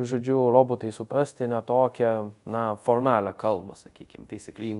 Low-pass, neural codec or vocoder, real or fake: 10.8 kHz; codec, 24 kHz, 0.5 kbps, DualCodec; fake